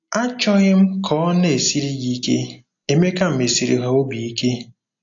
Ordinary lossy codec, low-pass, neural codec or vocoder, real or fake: AAC, 48 kbps; 7.2 kHz; none; real